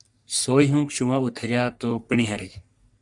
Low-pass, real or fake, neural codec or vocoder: 10.8 kHz; fake; codec, 44.1 kHz, 3.4 kbps, Pupu-Codec